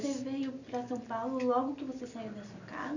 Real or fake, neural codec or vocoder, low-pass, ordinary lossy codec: real; none; 7.2 kHz; none